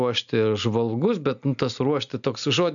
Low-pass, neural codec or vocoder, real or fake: 7.2 kHz; none; real